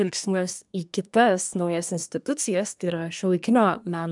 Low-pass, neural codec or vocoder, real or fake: 10.8 kHz; codec, 24 kHz, 1 kbps, SNAC; fake